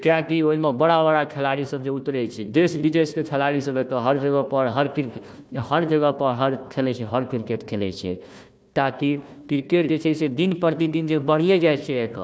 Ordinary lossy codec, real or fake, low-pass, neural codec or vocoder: none; fake; none; codec, 16 kHz, 1 kbps, FunCodec, trained on Chinese and English, 50 frames a second